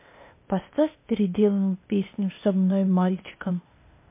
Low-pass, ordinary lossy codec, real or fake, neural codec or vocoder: 3.6 kHz; MP3, 24 kbps; fake; codec, 16 kHz, 0.8 kbps, ZipCodec